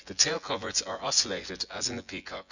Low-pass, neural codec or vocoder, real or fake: 7.2 kHz; vocoder, 24 kHz, 100 mel bands, Vocos; fake